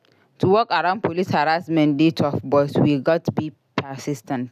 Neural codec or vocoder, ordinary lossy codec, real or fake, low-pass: none; none; real; 14.4 kHz